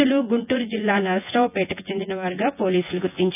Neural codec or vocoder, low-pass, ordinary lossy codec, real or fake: vocoder, 24 kHz, 100 mel bands, Vocos; 3.6 kHz; none; fake